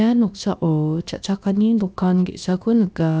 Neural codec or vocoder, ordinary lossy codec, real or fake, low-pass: codec, 16 kHz, about 1 kbps, DyCAST, with the encoder's durations; none; fake; none